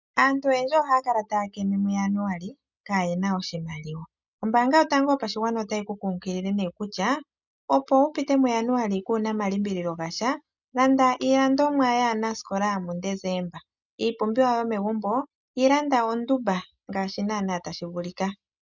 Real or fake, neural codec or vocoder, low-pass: real; none; 7.2 kHz